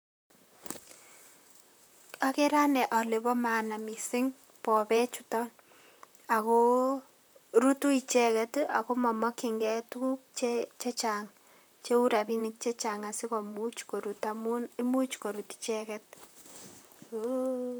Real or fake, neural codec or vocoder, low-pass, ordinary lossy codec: fake; vocoder, 44.1 kHz, 128 mel bands, Pupu-Vocoder; none; none